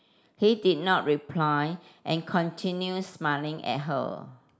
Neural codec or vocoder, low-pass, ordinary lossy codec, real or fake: none; none; none; real